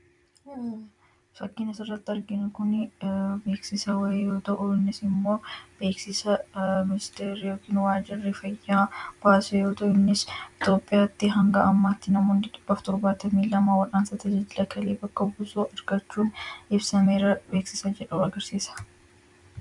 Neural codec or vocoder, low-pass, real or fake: vocoder, 44.1 kHz, 128 mel bands every 256 samples, BigVGAN v2; 10.8 kHz; fake